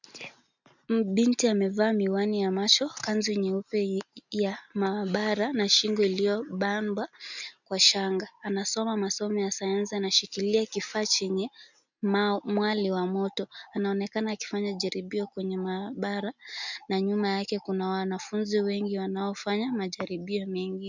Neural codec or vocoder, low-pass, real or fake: none; 7.2 kHz; real